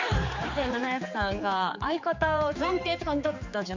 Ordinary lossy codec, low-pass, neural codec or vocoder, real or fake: MP3, 64 kbps; 7.2 kHz; codec, 16 kHz, 4 kbps, X-Codec, HuBERT features, trained on general audio; fake